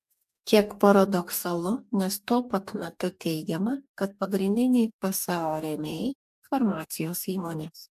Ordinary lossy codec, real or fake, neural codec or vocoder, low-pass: AAC, 96 kbps; fake; codec, 44.1 kHz, 2.6 kbps, DAC; 14.4 kHz